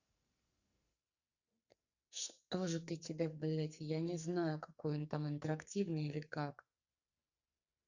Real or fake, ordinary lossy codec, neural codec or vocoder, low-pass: fake; Opus, 64 kbps; codec, 44.1 kHz, 2.6 kbps, SNAC; 7.2 kHz